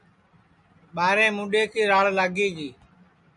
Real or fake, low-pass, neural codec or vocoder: real; 10.8 kHz; none